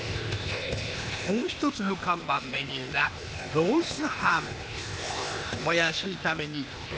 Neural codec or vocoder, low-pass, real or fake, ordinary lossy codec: codec, 16 kHz, 0.8 kbps, ZipCodec; none; fake; none